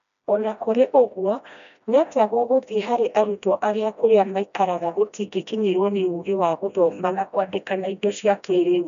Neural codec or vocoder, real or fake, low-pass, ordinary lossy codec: codec, 16 kHz, 1 kbps, FreqCodec, smaller model; fake; 7.2 kHz; none